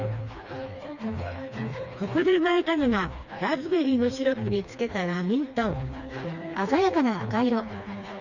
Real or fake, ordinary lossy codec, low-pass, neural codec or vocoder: fake; Opus, 64 kbps; 7.2 kHz; codec, 16 kHz, 2 kbps, FreqCodec, smaller model